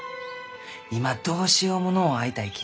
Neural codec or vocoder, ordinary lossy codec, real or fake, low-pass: none; none; real; none